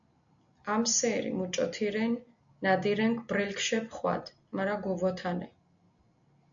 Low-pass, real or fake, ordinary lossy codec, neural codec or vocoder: 7.2 kHz; real; MP3, 64 kbps; none